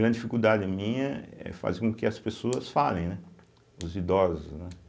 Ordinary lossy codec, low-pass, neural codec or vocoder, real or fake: none; none; none; real